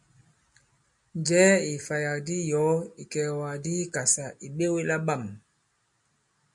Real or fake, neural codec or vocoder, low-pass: real; none; 10.8 kHz